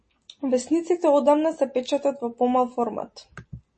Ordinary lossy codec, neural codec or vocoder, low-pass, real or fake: MP3, 32 kbps; none; 10.8 kHz; real